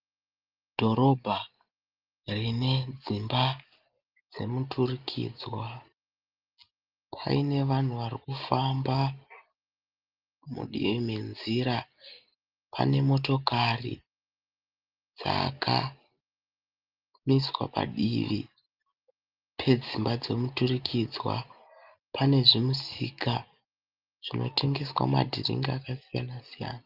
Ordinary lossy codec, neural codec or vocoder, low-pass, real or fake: Opus, 32 kbps; none; 5.4 kHz; real